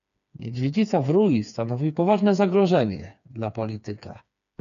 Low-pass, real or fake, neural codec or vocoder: 7.2 kHz; fake; codec, 16 kHz, 4 kbps, FreqCodec, smaller model